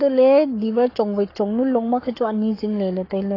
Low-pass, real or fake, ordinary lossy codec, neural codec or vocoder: 5.4 kHz; fake; AAC, 24 kbps; codec, 44.1 kHz, 7.8 kbps, Pupu-Codec